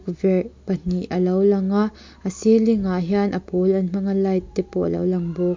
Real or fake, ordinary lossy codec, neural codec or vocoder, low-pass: real; MP3, 48 kbps; none; 7.2 kHz